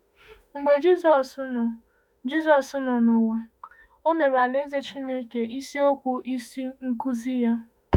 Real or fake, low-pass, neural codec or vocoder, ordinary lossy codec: fake; 19.8 kHz; autoencoder, 48 kHz, 32 numbers a frame, DAC-VAE, trained on Japanese speech; none